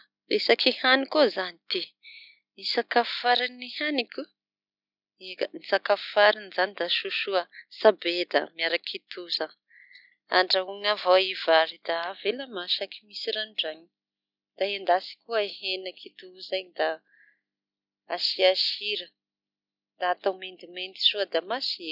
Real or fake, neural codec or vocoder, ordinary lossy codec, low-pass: real; none; none; 5.4 kHz